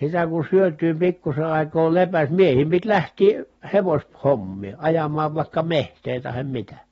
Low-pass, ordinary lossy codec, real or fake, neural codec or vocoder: 10.8 kHz; AAC, 24 kbps; real; none